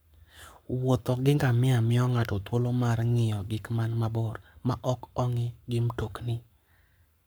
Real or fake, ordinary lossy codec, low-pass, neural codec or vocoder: fake; none; none; codec, 44.1 kHz, 7.8 kbps, Pupu-Codec